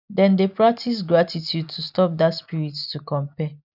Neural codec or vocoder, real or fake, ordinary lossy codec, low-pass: none; real; none; 5.4 kHz